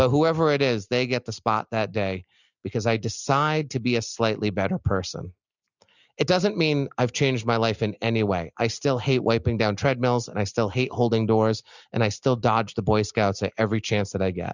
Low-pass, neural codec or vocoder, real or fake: 7.2 kHz; none; real